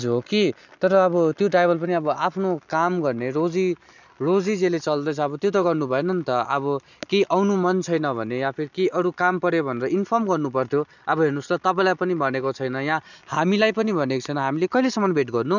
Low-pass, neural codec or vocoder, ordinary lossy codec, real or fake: 7.2 kHz; none; none; real